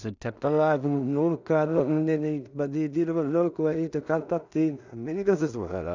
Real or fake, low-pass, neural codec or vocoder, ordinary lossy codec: fake; 7.2 kHz; codec, 16 kHz in and 24 kHz out, 0.4 kbps, LongCat-Audio-Codec, two codebook decoder; none